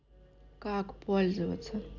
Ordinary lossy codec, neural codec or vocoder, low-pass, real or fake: none; none; 7.2 kHz; real